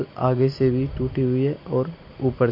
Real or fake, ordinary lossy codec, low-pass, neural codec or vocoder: real; MP3, 32 kbps; 5.4 kHz; none